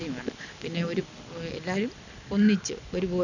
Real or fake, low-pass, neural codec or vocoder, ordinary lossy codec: real; 7.2 kHz; none; none